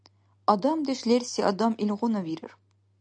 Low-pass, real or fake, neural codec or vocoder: 9.9 kHz; real; none